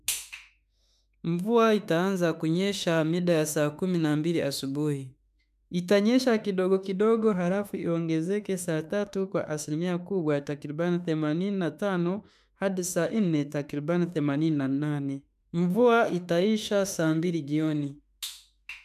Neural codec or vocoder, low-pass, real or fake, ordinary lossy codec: autoencoder, 48 kHz, 32 numbers a frame, DAC-VAE, trained on Japanese speech; 14.4 kHz; fake; none